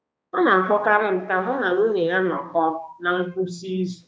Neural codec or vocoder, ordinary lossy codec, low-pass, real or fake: codec, 16 kHz, 2 kbps, X-Codec, HuBERT features, trained on balanced general audio; none; none; fake